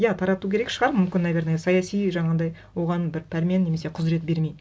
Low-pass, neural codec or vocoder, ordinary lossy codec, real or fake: none; none; none; real